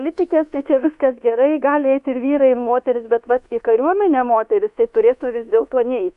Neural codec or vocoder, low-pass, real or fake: codec, 24 kHz, 1.2 kbps, DualCodec; 10.8 kHz; fake